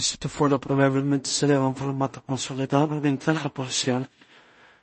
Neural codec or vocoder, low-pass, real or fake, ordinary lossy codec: codec, 16 kHz in and 24 kHz out, 0.4 kbps, LongCat-Audio-Codec, two codebook decoder; 10.8 kHz; fake; MP3, 32 kbps